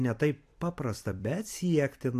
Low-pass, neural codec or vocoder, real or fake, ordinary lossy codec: 14.4 kHz; vocoder, 44.1 kHz, 128 mel bands every 256 samples, BigVGAN v2; fake; AAC, 64 kbps